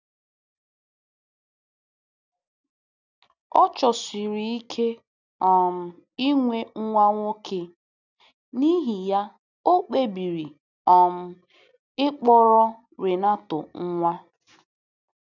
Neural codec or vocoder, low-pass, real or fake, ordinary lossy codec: none; 7.2 kHz; real; none